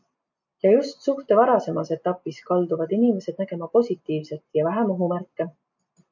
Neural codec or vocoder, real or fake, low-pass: none; real; 7.2 kHz